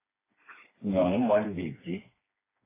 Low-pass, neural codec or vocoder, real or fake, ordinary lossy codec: 3.6 kHz; codec, 16 kHz, 2 kbps, FreqCodec, smaller model; fake; AAC, 24 kbps